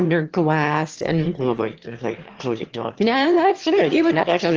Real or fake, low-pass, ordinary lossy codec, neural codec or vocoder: fake; 7.2 kHz; Opus, 16 kbps; autoencoder, 22.05 kHz, a latent of 192 numbers a frame, VITS, trained on one speaker